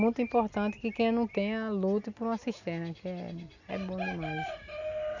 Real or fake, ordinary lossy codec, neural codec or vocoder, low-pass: real; none; none; 7.2 kHz